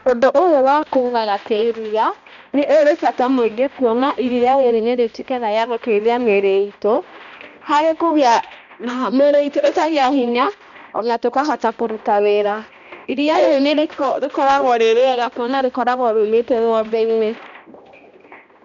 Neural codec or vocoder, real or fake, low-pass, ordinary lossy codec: codec, 16 kHz, 1 kbps, X-Codec, HuBERT features, trained on balanced general audio; fake; 7.2 kHz; none